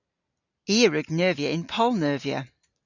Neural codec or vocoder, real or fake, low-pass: none; real; 7.2 kHz